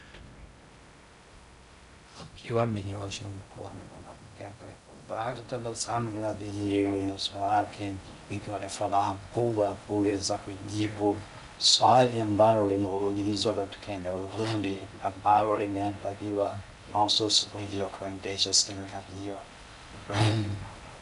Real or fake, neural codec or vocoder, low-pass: fake; codec, 16 kHz in and 24 kHz out, 0.6 kbps, FocalCodec, streaming, 2048 codes; 10.8 kHz